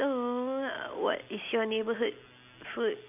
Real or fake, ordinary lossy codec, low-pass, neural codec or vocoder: real; none; 3.6 kHz; none